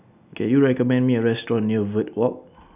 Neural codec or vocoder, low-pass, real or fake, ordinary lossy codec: none; 3.6 kHz; real; none